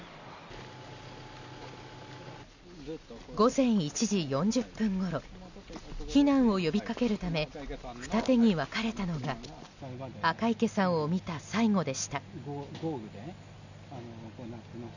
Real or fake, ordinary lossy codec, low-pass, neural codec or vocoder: real; none; 7.2 kHz; none